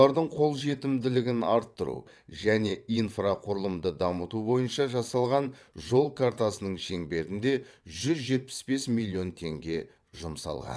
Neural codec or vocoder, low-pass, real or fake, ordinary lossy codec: vocoder, 22.05 kHz, 80 mel bands, WaveNeXt; none; fake; none